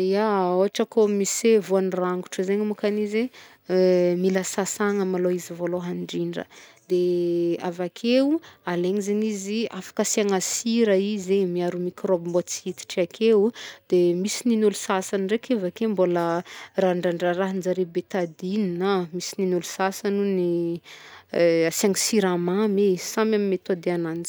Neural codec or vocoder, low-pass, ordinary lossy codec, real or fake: none; none; none; real